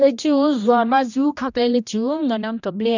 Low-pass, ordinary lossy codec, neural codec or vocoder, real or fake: 7.2 kHz; none; codec, 16 kHz, 1 kbps, X-Codec, HuBERT features, trained on general audio; fake